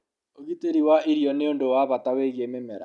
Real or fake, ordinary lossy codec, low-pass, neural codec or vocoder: real; none; 10.8 kHz; none